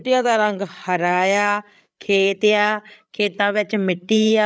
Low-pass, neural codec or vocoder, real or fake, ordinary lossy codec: none; codec, 16 kHz, 8 kbps, FreqCodec, larger model; fake; none